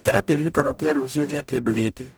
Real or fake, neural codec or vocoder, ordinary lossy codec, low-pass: fake; codec, 44.1 kHz, 0.9 kbps, DAC; none; none